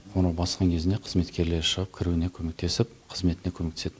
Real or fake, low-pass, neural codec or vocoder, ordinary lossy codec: real; none; none; none